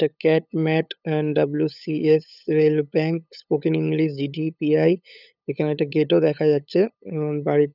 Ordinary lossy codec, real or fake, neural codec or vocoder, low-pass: none; fake; codec, 16 kHz, 8 kbps, FunCodec, trained on LibriTTS, 25 frames a second; 5.4 kHz